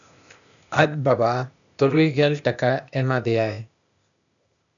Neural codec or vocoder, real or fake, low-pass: codec, 16 kHz, 0.8 kbps, ZipCodec; fake; 7.2 kHz